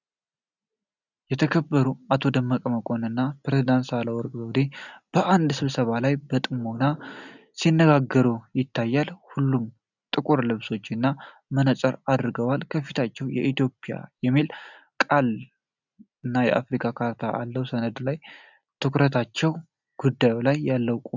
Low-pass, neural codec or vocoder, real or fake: 7.2 kHz; none; real